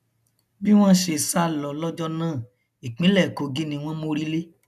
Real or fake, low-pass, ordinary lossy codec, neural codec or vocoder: real; 14.4 kHz; none; none